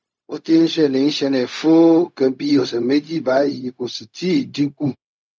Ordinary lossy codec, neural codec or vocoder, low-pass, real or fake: none; codec, 16 kHz, 0.4 kbps, LongCat-Audio-Codec; none; fake